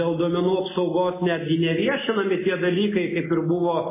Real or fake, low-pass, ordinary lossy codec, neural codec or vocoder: real; 3.6 kHz; MP3, 16 kbps; none